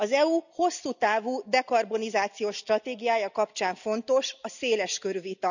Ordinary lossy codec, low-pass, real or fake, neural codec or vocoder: none; 7.2 kHz; real; none